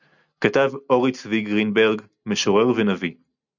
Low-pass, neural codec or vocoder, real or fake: 7.2 kHz; none; real